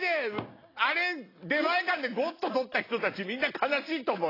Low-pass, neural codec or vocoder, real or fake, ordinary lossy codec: 5.4 kHz; none; real; AAC, 24 kbps